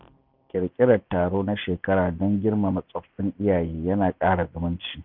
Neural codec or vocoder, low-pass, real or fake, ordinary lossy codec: none; 5.4 kHz; real; none